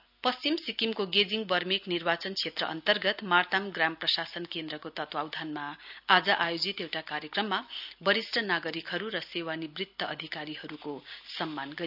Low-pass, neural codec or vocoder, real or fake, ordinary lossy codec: 5.4 kHz; none; real; none